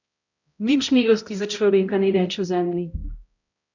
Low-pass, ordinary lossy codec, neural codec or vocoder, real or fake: 7.2 kHz; none; codec, 16 kHz, 0.5 kbps, X-Codec, HuBERT features, trained on balanced general audio; fake